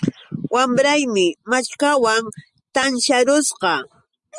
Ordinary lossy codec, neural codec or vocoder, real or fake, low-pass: Opus, 64 kbps; none; real; 10.8 kHz